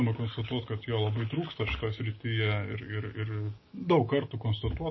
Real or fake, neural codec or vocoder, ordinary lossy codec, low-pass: real; none; MP3, 24 kbps; 7.2 kHz